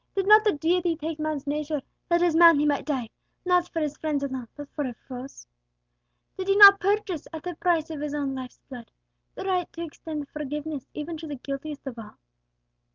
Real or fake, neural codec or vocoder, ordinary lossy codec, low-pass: real; none; Opus, 32 kbps; 7.2 kHz